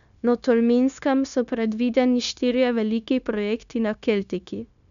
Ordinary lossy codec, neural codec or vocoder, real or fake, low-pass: none; codec, 16 kHz, 0.9 kbps, LongCat-Audio-Codec; fake; 7.2 kHz